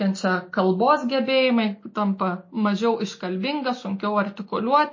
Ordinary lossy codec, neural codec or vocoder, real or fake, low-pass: MP3, 32 kbps; none; real; 7.2 kHz